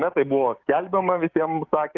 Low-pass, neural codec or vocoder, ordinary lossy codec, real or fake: 7.2 kHz; codec, 16 kHz, 6 kbps, DAC; Opus, 32 kbps; fake